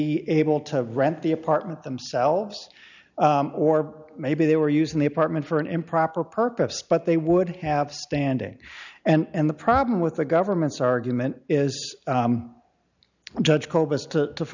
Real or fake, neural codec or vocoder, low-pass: real; none; 7.2 kHz